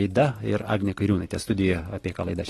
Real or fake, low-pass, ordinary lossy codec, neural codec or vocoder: real; 14.4 kHz; AAC, 32 kbps; none